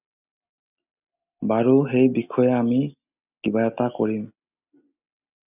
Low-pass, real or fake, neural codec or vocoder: 3.6 kHz; real; none